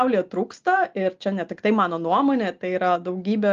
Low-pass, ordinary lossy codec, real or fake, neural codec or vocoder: 7.2 kHz; Opus, 32 kbps; real; none